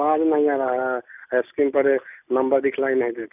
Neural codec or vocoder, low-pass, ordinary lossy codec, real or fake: none; 3.6 kHz; none; real